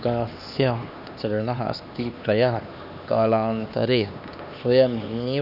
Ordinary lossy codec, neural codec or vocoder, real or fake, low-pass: none; codec, 16 kHz, 2 kbps, X-Codec, HuBERT features, trained on LibriSpeech; fake; 5.4 kHz